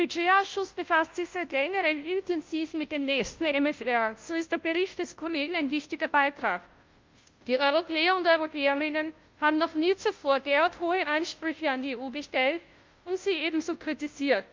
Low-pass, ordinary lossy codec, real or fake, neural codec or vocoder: 7.2 kHz; Opus, 24 kbps; fake; codec, 16 kHz, 0.5 kbps, FunCodec, trained on Chinese and English, 25 frames a second